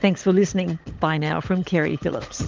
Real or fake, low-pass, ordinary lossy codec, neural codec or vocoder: fake; 7.2 kHz; Opus, 32 kbps; codec, 16 kHz, 16 kbps, FunCodec, trained on LibriTTS, 50 frames a second